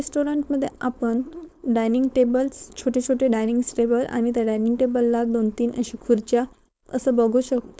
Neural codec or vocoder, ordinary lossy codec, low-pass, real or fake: codec, 16 kHz, 4.8 kbps, FACodec; none; none; fake